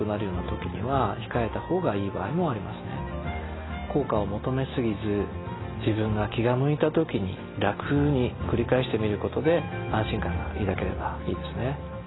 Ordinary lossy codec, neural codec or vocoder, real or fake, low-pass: AAC, 16 kbps; none; real; 7.2 kHz